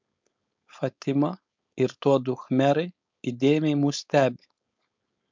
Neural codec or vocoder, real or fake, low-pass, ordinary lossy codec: codec, 16 kHz, 4.8 kbps, FACodec; fake; 7.2 kHz; MP3, 64 kbps